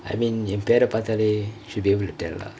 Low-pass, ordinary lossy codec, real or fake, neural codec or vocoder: none; none; real; none